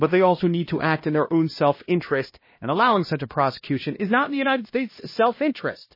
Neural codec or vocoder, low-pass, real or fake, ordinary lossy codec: codec, 16 kHz, 1 kbps, X-Codec, HuBERT features, trained on LibriSpeech; 5.4 kHz; fake; MP3, 24 kbps